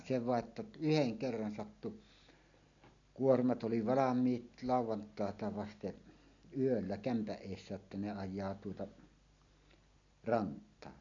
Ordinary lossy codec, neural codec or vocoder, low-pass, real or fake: none; none; 7.2 kHz; real